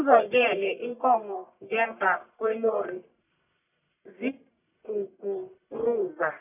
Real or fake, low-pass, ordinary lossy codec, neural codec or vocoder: fake; 3.6 kHz; none; codec, 44.1 kHz, 1.7 kbps, Pupu-Codec